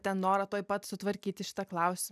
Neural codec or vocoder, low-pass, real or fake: none; 14.4 kHz; real